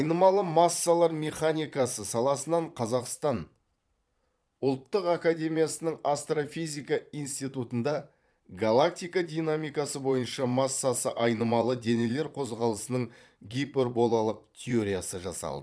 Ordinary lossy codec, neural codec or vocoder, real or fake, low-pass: none; vocoder, 22.05 kHz, 80 mel bands, Vocos; fake; none